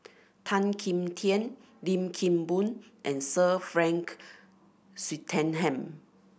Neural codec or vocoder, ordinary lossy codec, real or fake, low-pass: none; none; real; none